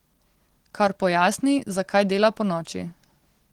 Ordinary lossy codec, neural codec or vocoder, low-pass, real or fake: Opus, 16 kbps; none; 19.8 kHz; real